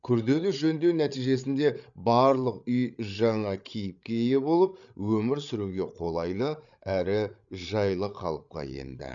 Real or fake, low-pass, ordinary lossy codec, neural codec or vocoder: fake; 7.2 kHz; none; codec, 16 kHz, 8 kbps, FreqCodec, larger model